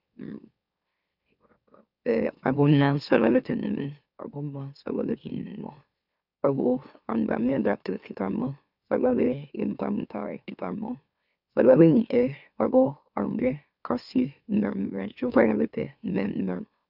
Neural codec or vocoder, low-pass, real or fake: autoencoder, 44.1 kHz, a latent of 192 numbers a frame, MeloTTS; 5.4 kHz; fake